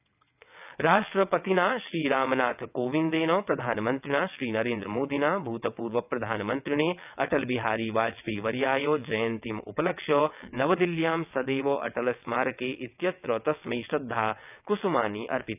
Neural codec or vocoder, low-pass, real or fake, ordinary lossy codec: vocoder, 22.05 kHz, 80 mel bands, WaveNeXt; 3.6 kHz; fake; none